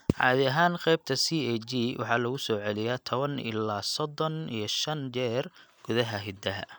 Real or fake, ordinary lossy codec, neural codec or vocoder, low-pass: real; none; none; none